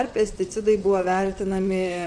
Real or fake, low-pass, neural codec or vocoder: fake; 9.9 kHz; vocoder, 44.1 kHz, 128 mel bands, Pupu-Vocoder